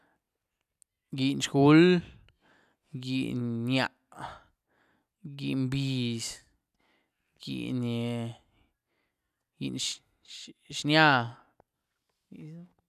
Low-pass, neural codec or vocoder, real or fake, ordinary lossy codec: 14.4 kHz; none; real; none